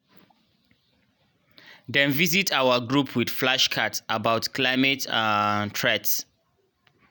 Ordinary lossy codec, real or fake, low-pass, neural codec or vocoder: none; real; none; none